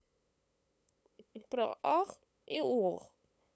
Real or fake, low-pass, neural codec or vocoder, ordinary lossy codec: fake; none; codec, 16 kHz, 8 kbps, FunCodec, trained on LibriTTS, 25 frames a second; none